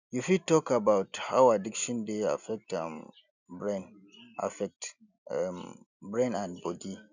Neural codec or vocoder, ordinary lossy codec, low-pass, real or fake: none; none; 7.2 kHz; real